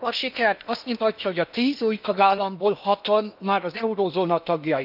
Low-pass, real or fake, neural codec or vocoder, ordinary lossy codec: 5.4 kHz; fake; codec, 16 kHz in and 24 kHz out, 0.8 kbps, FocalCodec, streaming, 65536 codes; none